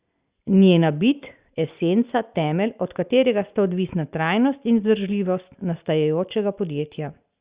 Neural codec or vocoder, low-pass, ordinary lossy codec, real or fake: none; 3.6 kHz; Opus, 64 kbps; real